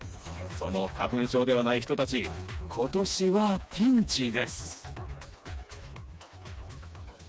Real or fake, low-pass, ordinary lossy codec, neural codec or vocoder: fake; none; none; codec, 16 kHz, 2 kbps, FreqCodec, smaller model